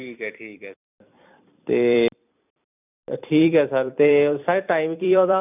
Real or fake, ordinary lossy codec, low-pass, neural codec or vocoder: fake; none; 3.6 kHz; vocoder, 44.1 kHz, 128 mel bands every 256 samples, BigVGAN v2